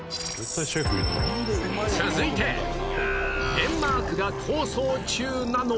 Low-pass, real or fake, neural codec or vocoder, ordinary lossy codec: none; real; none; none